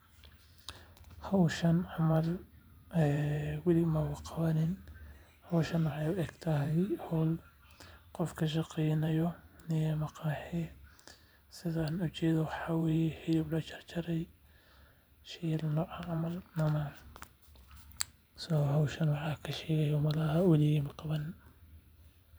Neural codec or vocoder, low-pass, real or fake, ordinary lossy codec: vocoder, 44.1 kHz, 128 mel bands every 512 samples, BigVGAN v2; none; fake; none